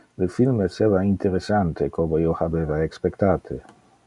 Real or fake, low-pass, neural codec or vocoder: real; 10.8 kHz; none